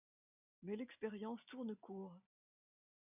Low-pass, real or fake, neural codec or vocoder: 3.6 kHz; real; none